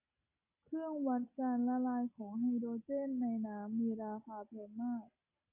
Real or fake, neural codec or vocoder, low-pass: real; none; 3.6 kHz